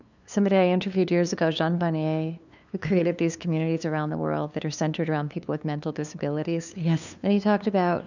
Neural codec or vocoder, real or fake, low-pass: codec, 16 kHz, 2 kbps, FunCodec, trained on LibriTTS, 25 frames a second; fake; 7.2 kHz